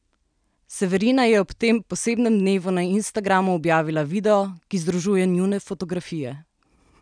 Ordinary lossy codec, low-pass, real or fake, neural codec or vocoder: none; 9.9 kHz; real; none